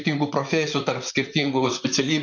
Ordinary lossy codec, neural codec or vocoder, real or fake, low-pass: MP3, 64 kbps; vocoder, 44.1 kHz, 128 mel bands, Pupu-Vocoder; fake; 7.2 kHz